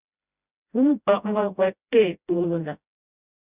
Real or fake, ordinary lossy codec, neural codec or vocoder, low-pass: fake; Opus, 64 kbps; codec, 16 kHz, 0.5 kbps, FreqCodec, smaller model; 3.6 kHz